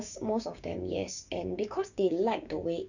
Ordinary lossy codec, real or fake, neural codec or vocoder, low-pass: none; fake; codec, 16 kHz, 6 kbps, DAC; 7.2 kHz